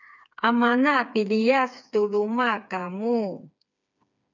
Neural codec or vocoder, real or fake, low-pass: codec, 16 kHz, 4 kbps, FreqCodec, smaller model; fake; 7.2 kHz